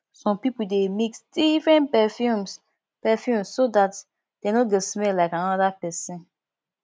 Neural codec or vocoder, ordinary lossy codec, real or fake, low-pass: none; none; real; none